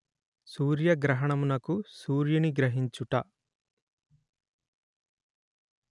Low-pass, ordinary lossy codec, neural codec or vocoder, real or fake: 10.8 kHz; none; none; real